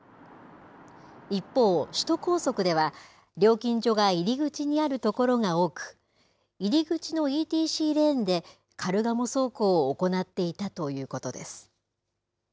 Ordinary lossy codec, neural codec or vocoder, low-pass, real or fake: none; none; none; real